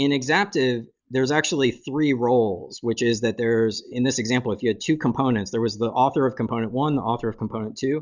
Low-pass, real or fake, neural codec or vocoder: 7.2 kHz; real; none